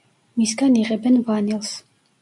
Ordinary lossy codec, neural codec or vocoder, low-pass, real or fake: MP3, 64 kbps; none; 10.8 kHz; real